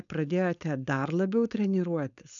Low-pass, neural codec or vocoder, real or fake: 7.2 kHz; codec, 16 kHz, 4.8 kbps, FACodec; fake